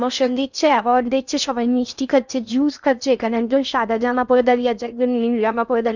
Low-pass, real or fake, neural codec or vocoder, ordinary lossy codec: 7.2 kHz; fake; codec, 16 kHz in and 24 kHz out, 0.6 kbps, FocalCodec, streaming, 4096 codes; none